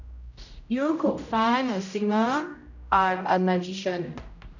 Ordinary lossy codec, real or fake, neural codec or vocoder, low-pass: none; fake; codec, 16 kHz, 0.5 kbps, X-Codec, HuBERT features, trained on general audio; 7.2 kHz